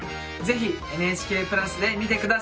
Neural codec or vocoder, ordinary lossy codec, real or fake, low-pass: none; none; real; none